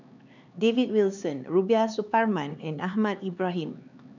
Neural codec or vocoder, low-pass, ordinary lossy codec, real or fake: codec, 16 kHz, 4 kbps, X-Codec, HuBERT features, trained on LibriSpeech; 7.2 kHz; none; fake